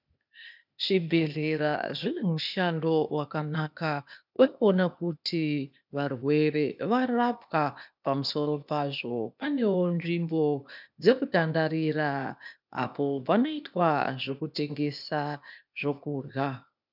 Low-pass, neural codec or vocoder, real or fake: 5.4 kHz; codec, 16 kHz, 0.8 kbps, ZipCodec; fake